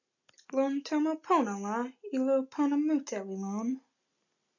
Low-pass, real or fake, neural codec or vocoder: 7.2 kHz; real; none